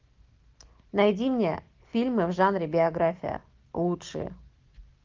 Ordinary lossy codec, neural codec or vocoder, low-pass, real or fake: Opus, 32 kbps; none; 7.2 kHz; real